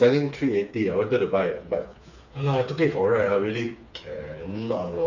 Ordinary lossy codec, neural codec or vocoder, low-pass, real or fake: none; codec, 16 kHz, 4 kbps, FreqCodec, smaller model; 7.2 kHz; fake